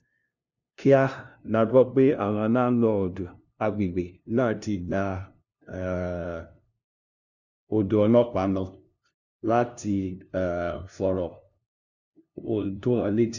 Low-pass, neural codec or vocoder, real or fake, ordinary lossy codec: 7.2 kHz; codec, 16 kHz, 0.5 kbps, FunCodec, trained on LibriTTS, 25 frames a second; fake; none